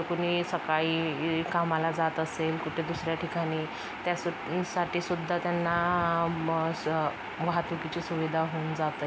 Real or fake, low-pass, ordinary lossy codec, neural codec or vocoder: real; none; none; none